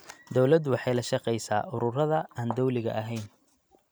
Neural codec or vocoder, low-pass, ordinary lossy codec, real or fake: none; none; none; real